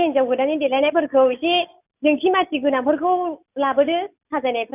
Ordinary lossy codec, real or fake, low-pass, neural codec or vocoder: none; real; 3.6 kHz; none